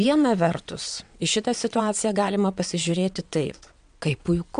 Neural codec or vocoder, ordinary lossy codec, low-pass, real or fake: vocoder, 22.05 kHz, 80 mel bands, WaveNeXt; MP3, 64 kbps; 9.9 kHz; fake